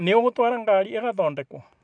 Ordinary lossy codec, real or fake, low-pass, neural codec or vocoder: none; real; 9.9 kHz; none